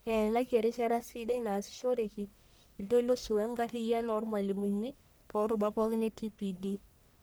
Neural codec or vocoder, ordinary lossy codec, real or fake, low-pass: codec, 44.1 kHz, 1.7 kbps, Pupu-Codec; none; fake; none